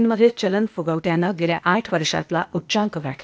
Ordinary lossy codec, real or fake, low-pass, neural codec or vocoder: none; fake; none; codec, 16 kHz, 0.8 kbps, ZipCodec